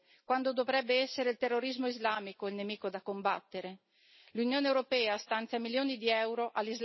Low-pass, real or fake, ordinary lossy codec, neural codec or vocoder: 7.2 kHz; real; MP3, 24 kbps; none